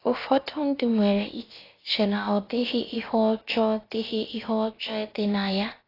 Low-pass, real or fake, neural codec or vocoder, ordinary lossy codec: 5.4 kHz; fake; codec, 16 kHz, about 1 kbps, DyCAST, with the encoder's durations; AAC, 24 kbps